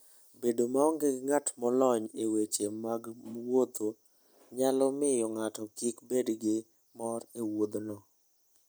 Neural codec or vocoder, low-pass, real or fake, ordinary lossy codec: none; none; real; none